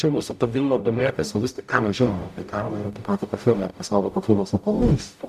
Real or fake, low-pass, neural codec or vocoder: fake; 14.4 kHz; codec, 44.1 kHz, 0.9 kbps, DAC